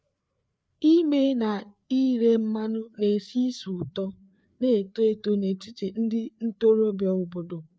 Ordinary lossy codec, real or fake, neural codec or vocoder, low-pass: none; fake; codec, 16 kHz, 4 kbps, FreqCodec, larger model; none